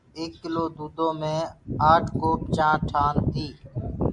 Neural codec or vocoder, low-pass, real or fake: none; 10.8 kHz; real